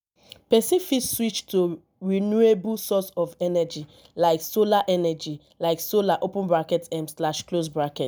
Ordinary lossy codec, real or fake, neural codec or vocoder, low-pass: none; real; none; none